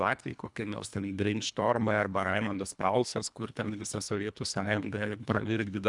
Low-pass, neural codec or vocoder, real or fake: 10.8 kHz; codec, 24 kHz, 1.5 kbps, HILCodec; fake